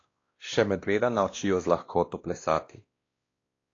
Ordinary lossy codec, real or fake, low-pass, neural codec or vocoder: AAC, 32 kbps; fake; 7.2 kHz; codec, 16 kHz, 1 kbps, X-Codec, WavLM features, trained on Multilingual LibriSpeech